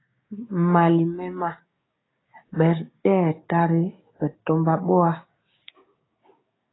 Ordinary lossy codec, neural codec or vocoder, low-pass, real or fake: AAC, 16 kbps; codec, 16 kHz, 6 kbps, DAC; 7.2 kHz; fake